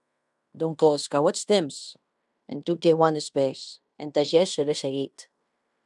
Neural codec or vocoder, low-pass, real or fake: codec, 16 kHz in and 24 kHz out, 0.9 kbps, LongCat-Audio-Codec, fine tuned four codebook decoder; 10.8 kHz; fake